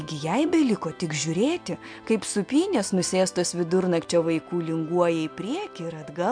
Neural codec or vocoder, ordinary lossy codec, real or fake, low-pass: none; MP3, 64 kbps; real; 9.9 kHz